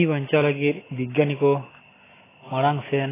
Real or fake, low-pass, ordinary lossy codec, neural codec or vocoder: real; 3.6 kHz; AAC, 16 kbps; none